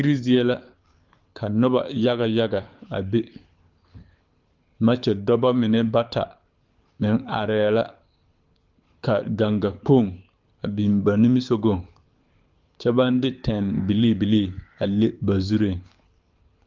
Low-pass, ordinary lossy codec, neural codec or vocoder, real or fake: 7.2 kHz; Opus, 24 kbps; codec, 24 kHz, 6 kbps, HILCodec; fake